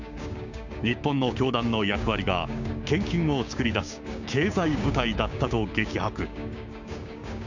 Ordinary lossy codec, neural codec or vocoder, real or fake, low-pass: none; codec, 16 kHz, 6 kbps, DAC; fake; 7.2 kHz